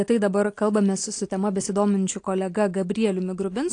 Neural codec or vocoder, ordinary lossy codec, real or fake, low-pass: none; AAC, 48 kbps; real; 9.9 kHz